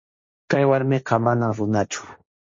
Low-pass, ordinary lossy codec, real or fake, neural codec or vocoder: 7.2 kHz; MP3, 32 kbps; fake; codec, 16 kHz, 1.1 kbps, Voila-Tokenizer